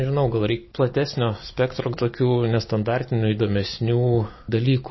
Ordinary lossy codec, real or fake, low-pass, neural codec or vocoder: MP3, 24 kbps; real; 7.2 kHz; none